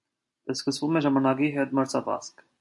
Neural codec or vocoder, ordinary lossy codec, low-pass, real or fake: none; AAC, 64 kbps; 10.8 kHz; real